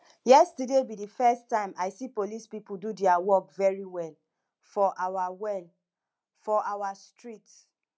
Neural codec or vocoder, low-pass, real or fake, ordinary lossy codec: none; none; real; none